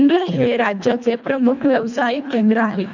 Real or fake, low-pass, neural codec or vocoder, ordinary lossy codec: fake; 7.2 kHz; codec, 24 kHz, 1.5 kbps, HILCodec; none